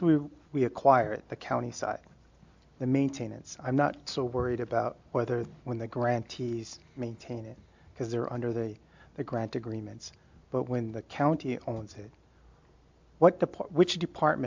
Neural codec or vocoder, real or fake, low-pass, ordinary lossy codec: none; real; 7.2 kHz; MP3, 64 kbps